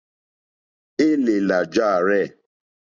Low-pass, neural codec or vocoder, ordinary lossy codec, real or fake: 7.2 kHz; none; Opus, 64 kbps; real